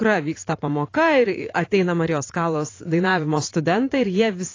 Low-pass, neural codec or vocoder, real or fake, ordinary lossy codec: 7.2 kHz; vocoder, 44.1 kHz, 80 mel bands, Vocos; fake; AAC, 32 kbps